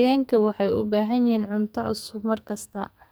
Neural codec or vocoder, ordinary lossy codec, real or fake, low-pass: codec, 44.1 kHz, 2.6 kbps, SNAC; none; fake; none